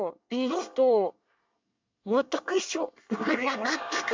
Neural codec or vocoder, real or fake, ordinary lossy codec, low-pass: codec, 24 kHz, 1 kbps, SNAC; fake; MP3, 64 kbps; 7.2 kHz